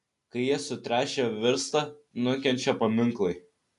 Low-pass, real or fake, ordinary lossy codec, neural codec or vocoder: 10.8 kHz; real; AAC, 64 kbps; none